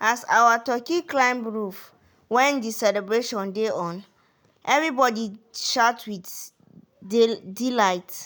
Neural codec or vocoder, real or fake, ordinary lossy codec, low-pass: none; real; none; none